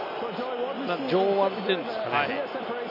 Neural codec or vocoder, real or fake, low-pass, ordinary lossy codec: none; real; 5.4 kHz; none